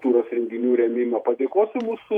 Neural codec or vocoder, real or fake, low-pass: vocoder, 48 kHz, 128 mel bands, Vocos; fake; 19.8 kHz